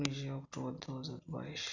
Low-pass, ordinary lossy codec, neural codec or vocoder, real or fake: 7.2 kHz; none; none; real